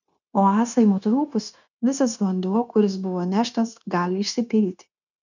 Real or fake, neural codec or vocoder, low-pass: fake; codec, 16 kHz, 0.9 kbps, LongCat-Audio-Codec; 7.2 kHz